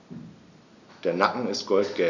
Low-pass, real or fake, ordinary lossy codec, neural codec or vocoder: 7.2 kHz; real; none; none